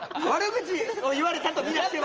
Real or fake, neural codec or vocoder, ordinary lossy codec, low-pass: real; none; Opus, 24 kbps; 7.2 kHz